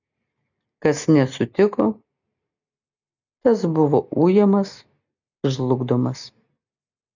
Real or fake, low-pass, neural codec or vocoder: real; 7.2 kHz; none